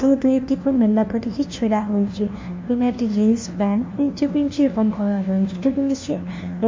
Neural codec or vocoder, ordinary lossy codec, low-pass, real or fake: codec, 16 kHz, 1 kbps, FunCodec, trained on LibriTTS, 50 frames a second; MP3, 48 kbps; 7.2 kHz; fake